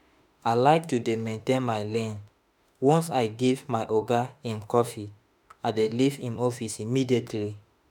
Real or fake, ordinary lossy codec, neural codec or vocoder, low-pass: fake; none; autoencoder, 48 kHz, 32 numbers a frame, DAC-VAE, trained on Japanese speech; none